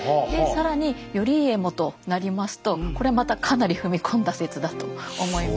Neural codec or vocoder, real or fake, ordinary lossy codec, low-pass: none; real; none; none